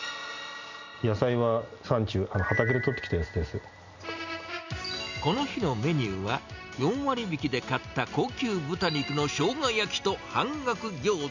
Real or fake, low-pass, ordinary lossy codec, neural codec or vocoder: real; 7.2 kHz; none; none